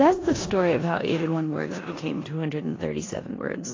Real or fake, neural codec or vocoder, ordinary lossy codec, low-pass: fake; codec, 16 kHz in and 24 kHz out, 0.9 kbps, LongCat-Audio-Codec, four codebook decoder; AAC, 32 kbps; 7.2 kHz